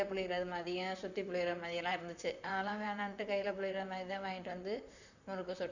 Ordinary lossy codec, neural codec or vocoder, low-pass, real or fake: none; vocoder, 44.1 kHz, 128 mel bands, Pupu-Vocoder; 7.2 kHz; fake